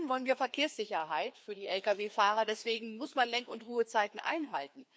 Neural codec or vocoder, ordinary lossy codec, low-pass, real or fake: codec, 16 kHz, 2 kbps, FreqCodec, larger model; none; none; fake